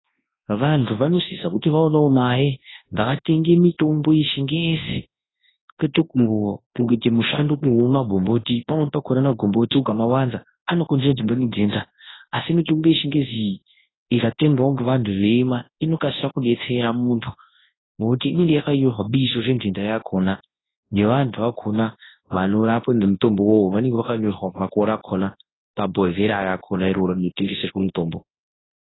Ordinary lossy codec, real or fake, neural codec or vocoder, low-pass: AAC, 16 kbps; fake; codec, 24 kHz, 0.9 kbps, WavTokenizer, large speech release; 7.2 kHz